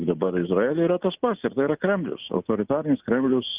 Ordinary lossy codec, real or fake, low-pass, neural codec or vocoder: Opus, 24 kbps; real; 3.6 kHz; none